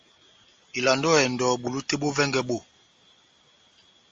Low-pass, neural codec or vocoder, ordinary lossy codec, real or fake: 7.2 kHz; none; Opus, 32 kbps; real